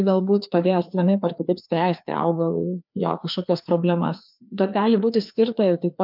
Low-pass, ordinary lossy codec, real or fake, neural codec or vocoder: 5.4 kHz; MP3, 48 kbps; fake; codec, 16 kHz, 2 kbps, FreqCodec, larger model